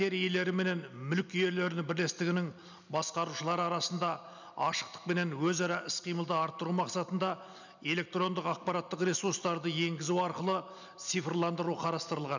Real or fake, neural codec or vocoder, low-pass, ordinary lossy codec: real; none; 7.2 kHz; none